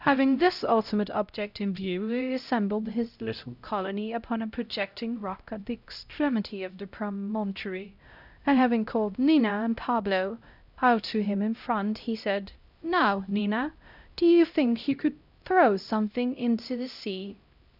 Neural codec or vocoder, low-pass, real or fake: codec, 16 kHz, 0.5 kbps, X-Codec, HuBERT features, trained on LibriSpeech; 5.4 kHz; fake